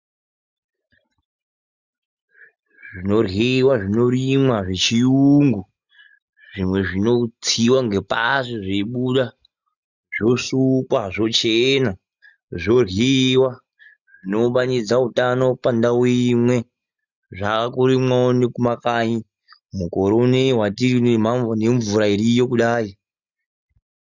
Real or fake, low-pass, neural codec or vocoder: real; 7.2 kHz; none